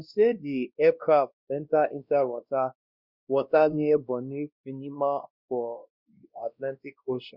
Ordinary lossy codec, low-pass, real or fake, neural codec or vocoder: Opus, 64 kbps; 5.4 kHz; fake; codec, 16 kHz, 1 kbps, X-Codec, WavLM features, trained on Multilingual LibriSpeech